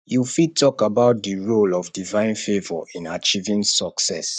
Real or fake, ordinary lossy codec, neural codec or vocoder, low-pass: fake; none; codec, 44.1 kHz, 7.8 kbps, Pupu-Codec; 9.9 kHz